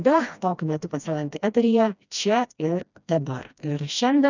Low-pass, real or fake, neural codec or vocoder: 7.2 kHz; fake; codec, 16 kHz, 1 kbps, FreqCodec, smaller model